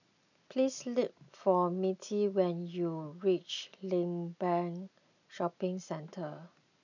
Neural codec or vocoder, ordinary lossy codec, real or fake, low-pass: none; none; real; 7.2 kHz